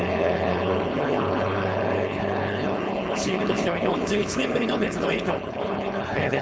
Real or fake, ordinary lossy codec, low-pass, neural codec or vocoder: fake; none; none; codec, 16 kHz, 4.8 kbps, FACodec